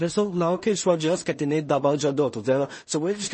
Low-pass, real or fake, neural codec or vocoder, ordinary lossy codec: 10.8 kHz; fake; codec, 16 kHz in and 24 kHz out, 0.4 kbps, LongCat-Audio-Codec, two codebook decoder; MP3, 32 kbps